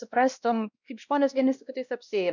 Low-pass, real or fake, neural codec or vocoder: 7.2 kHz; fake; codec, 16 kHz, 1 kbps, X-Codec, WavLM features, trained on Multilingual LibriSpeech